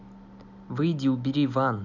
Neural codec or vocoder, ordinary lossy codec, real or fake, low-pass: none; none; real; 7.2 kHz